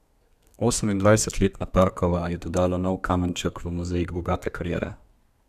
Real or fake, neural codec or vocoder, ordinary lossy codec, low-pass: fake; codec, 32 kHz, 1.9 kbps, SNAC; none; 14.4 kHz